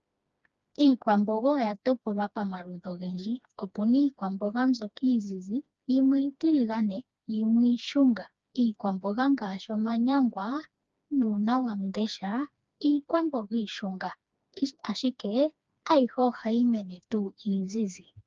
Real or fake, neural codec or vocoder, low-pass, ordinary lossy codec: fake; codec, 16 kHz, 2 kbps, FreqCodec, smaller model; 7.2 kHz; Opus, 24 kbps